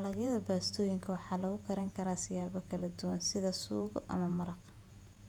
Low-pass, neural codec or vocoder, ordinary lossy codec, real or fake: 19.8 kHz; vocoder, 48 kHz, 128 mel bands, Vocos; none; fake